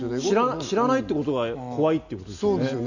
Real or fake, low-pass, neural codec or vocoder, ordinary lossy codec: real; 7.2 kHz; none; none